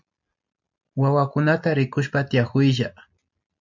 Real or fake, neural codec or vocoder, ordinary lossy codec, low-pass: real; none; MP3, 64 kbps; 7.2 kHz